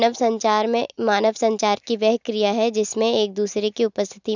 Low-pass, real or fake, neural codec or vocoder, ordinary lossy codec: 7.2 kHz; real; none; none